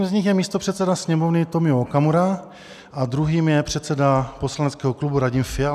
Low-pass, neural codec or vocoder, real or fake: 14.4 kHz; none; real